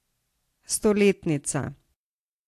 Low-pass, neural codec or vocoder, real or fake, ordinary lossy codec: 14.4 kHz; none; real; AAC, 64 kbps